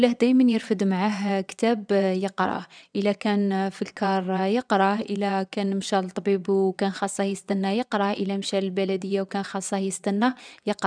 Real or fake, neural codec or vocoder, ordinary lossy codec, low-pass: fake; vocoder, 22.05 kHz, 80 mel bands, WaveNeXt; none; 9.9 kHz